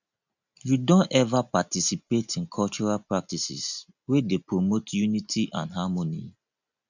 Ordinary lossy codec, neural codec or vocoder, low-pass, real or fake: none; none; 7.2 kHz; real